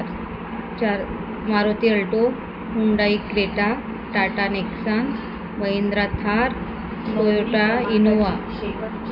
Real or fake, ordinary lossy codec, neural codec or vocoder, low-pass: real; none; none; 5.4 kHz